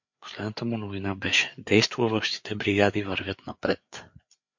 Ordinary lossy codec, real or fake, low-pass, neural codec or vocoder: MP3, 48 kbps; fake; 7.2 kHz; codec, 16 kHz, 4 kbps, FreqCodec, larger model